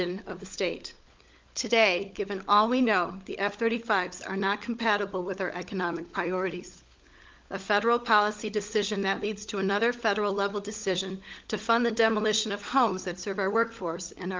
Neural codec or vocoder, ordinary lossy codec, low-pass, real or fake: codec, 16 kHz, 16 kbps, FunCodec, trained on LibriTTS, 50 frames a second; Opus, 32 kbps; 7.2 kHz; fake